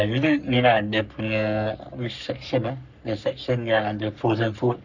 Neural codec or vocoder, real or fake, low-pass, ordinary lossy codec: codec, 44.1 kHz, 3.4 kbps, Pupu-Codec; fake; 7.2 kHz; none